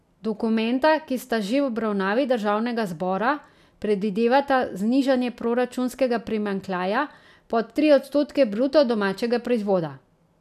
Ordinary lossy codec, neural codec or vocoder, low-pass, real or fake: none; none; 14.4 kHz; real